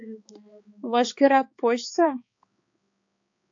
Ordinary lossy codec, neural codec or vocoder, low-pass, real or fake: AAC, 48 kbps; codec, 16 kHz, 4 kbps, X-Codec, HuBERT features, trained on balanced general audio; 7.2 kHz; fake